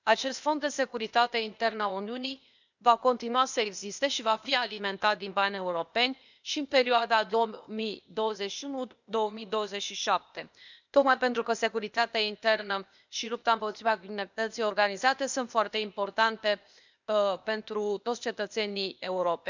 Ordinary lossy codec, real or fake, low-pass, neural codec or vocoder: none; fake; 7.2 kHz; codec, 16 kHz, 0.8 kbps, ZipCodec